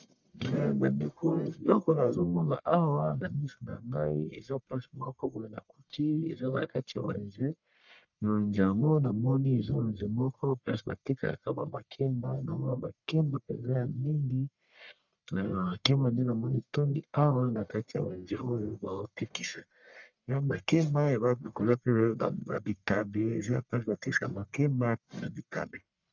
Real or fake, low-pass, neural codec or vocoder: fake; 7.2 kHz; codec, 44.1 kHz, 1.7 kbps, Pupu-Codec